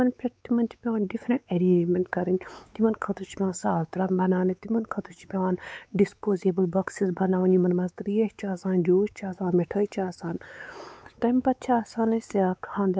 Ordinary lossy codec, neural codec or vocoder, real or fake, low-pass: none; codec, 16 kHz, 4 kbps, X-Codec, WavLM features, trained on Multilingual LibriSpeech; fake; none